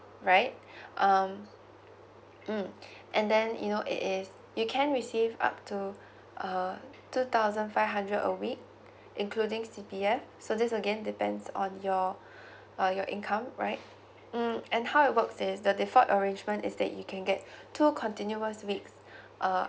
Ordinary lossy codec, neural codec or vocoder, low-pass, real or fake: none; none; none; real